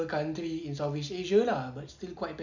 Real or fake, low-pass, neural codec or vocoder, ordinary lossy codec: real; 7.2 kHz; none; none